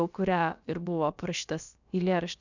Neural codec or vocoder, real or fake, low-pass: codec, 16 kHz, about 1 kbps, DyCAST, with the encoder's durations; fake; 7.2 kHz